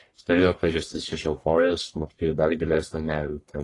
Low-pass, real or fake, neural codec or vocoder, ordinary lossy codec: 10.8 kHz; fake; codec, 44.1 kHz, 1.7 kbps, Pupu-Codec; AAC, 32 kbps